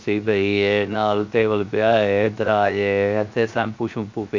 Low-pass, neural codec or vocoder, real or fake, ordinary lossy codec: 7.2 kHz; codec, 16 kHz, 0.7 kbps, FocalCodec; fake; MP3, 48 kbps